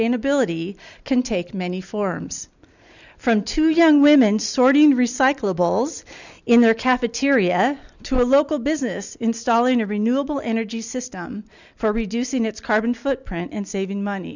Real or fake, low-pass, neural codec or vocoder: real; 7.2 kHz; none